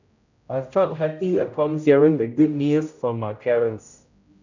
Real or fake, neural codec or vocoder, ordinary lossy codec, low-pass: fake; codec, 16 kHz, 0.5 kbps, X-Codec, HuBERT features, trained on general audio; none; 7.2 kHz